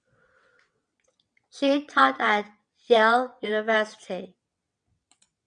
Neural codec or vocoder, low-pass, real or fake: vocoder, 22.05 kHz, 80 mel bands, WaveNeXt; 9.9 kHz; fake